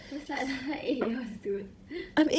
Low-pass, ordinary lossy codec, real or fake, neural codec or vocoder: none; none; fake; codec, 16 kHz, 16 kbps, FunCodec, trained on Chinese and English, 50 frames a second